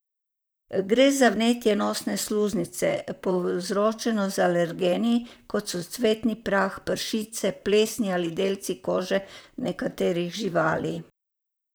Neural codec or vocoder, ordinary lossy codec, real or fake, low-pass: vocoder, 44.1 kHz, 128 mel bands, Pupu-Vocoder; none; fake; none